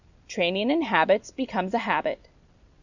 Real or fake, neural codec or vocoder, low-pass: real; none; 7.2 kHz